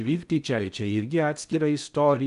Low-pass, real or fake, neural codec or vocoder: 10.8 kHz; fake; codec, 16 kHz in and 24 kHz out, 0.6 kbps, FocalCodec, streaming, 2048 codes